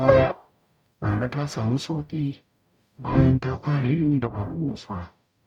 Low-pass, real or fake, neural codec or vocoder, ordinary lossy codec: 19.8 kHz; fake; codec, 44.1 kHz, 0.9 kbps, DAC; none